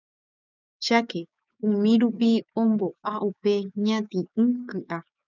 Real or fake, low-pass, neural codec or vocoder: fake; 7.2 kHz; codec, 16 kHz, 6 kbps, DAC